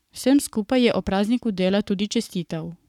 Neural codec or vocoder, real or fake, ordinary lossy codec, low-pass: codec, 44.1 kHz, 7.8 kbps, Pupu-Codec; fake; none; 19.8 kHz